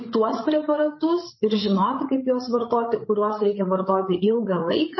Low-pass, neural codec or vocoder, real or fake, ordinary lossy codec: 7.2 kHz; codec, 16 kHz, 8 kbps, FreqCodec, larger model; fake; MP3, 24 kbps